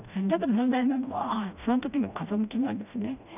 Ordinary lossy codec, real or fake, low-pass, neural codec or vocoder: none; fake; 3.6 kHz; codec, 16 kHz, 1 kbps, FreqCodec, smaller model